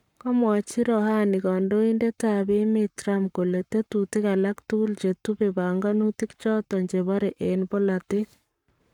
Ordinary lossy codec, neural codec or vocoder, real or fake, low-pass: none; codec, 44.1 kHz, 7.8 kbps, Pupu-Codec; fake; 19.8 kHz